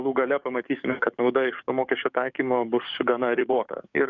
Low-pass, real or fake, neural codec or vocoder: 7.2 kHz; fake; codec, 44.1 kHz, 7.8 kbps, DAC